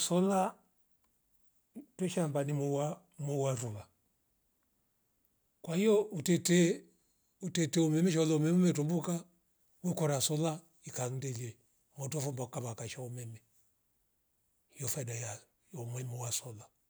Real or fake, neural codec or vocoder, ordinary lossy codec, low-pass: real; none; none; none